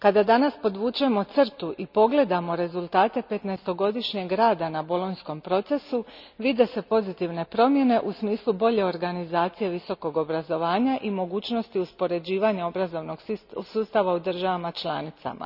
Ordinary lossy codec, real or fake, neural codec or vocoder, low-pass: none; real; none; 5.4 kHz